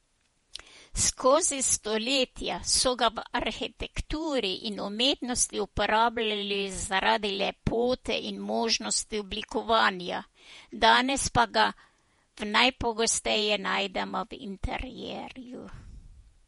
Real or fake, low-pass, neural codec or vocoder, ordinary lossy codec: fake; 19.8 kHz; vocoder, 48 kHz, 128 mel bands, Vocos; MP3, 48 kbps